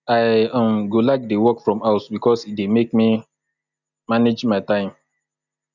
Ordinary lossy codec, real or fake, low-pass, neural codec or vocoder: none; real; 7.2 kHz; none